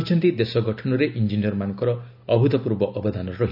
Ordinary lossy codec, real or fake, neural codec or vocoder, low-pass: none; real; none; 5.4 kHz